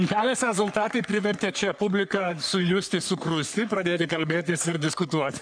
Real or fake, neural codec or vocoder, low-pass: fake; codec, 44.1 kHz, 3.4 kbps, Pupu-Codec; 9.9 kHz